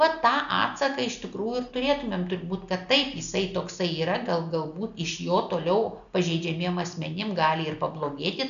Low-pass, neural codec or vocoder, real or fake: 7.2 kHz; none; real